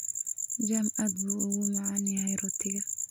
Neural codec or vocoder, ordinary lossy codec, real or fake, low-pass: none; none; real; none